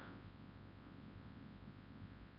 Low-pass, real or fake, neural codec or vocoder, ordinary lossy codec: 5.4 kHz; fake; codec, 24 kHz, 0.9 kbps, WavTokenizer, large speech release; none